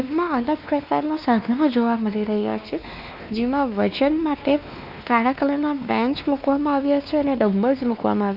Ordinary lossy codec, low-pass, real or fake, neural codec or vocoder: none; 5.4 kHz; fake; codec, 16 kHz, 2 kbps, X-Codec, WavLM features, trained on Multilingual LibriSpeech